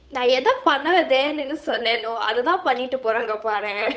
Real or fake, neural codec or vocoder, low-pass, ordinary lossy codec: fake; codec, 16 kHz, 8 kbps, FunCodec, trained on Chinese and English, 25 frames a second; none; none